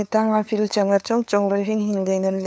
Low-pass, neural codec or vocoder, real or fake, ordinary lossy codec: none; codec, 16 kHz, 2 kbps, FunCodec, trained on LibriTTS, 25 frames a second; fake; none